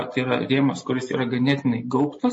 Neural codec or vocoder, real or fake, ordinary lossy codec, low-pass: none; real; MP3, 32 kbps; 9.9 kHz